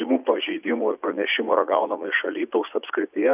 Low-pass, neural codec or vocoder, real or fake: 3.6 kHz; vocoder, 44.1 kHz, 80 mel bands, Vocos; fake